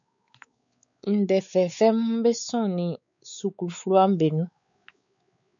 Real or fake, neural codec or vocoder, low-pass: fake; codec, 16 kHz, 4 kbps, X-Codec, WavLM features, trained on Multilingual LibriSpeech; 7.2 kHz